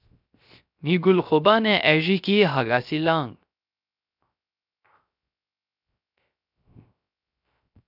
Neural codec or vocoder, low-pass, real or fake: codec, 16 kHz, 0.3 kbps, FocalCodec; 5.4 kHz; fake